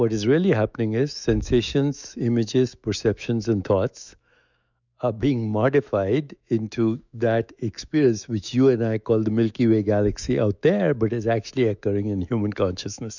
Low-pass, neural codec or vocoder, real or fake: 7.2 kHz; autoencoder, 48 kHz, 128 numbers a frame, DAC-VAE, trained on Japanese speech; fake